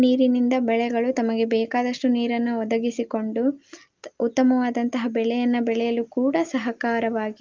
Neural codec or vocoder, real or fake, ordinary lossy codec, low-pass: none; real; Opus, 24 kbps; 7.2 kHz